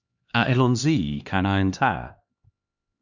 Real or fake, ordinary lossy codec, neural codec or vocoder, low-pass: fake; Opus, 64 kbps; codec, 16 kHz, 2 kbps, X-Codec, HuBERT features, trained on LibriSpeech; 7.2 kHz